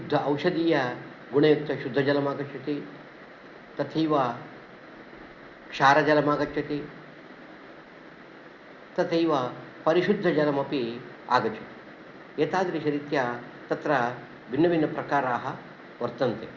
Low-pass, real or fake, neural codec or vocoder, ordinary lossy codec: 7.2 kHz; real; none; Opus, 64 kbps